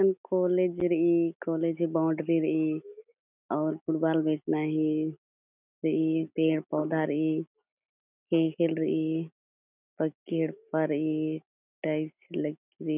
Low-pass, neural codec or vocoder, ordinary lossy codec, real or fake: 3.6 kHz; none; none; real